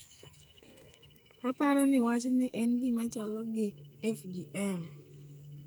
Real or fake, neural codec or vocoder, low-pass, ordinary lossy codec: fake; codec, 44.1 kHz, 2.6 kbps, SNAC; none; none